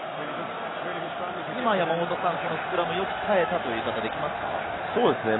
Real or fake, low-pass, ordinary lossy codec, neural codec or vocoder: real; 7.2 kHz; AAC, 16 kbps; none